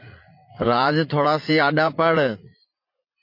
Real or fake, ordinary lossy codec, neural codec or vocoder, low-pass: fake; MP3, 32 kbps; vocoder, 44.1 kHz, 80 mel bands, Vocos; 5.4 kHz